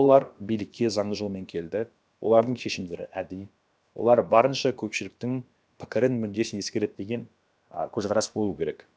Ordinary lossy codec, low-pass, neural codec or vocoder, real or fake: none; none; codec, 16 kHz, about 1 kbps, DyCAST, with the encoder's durations; fake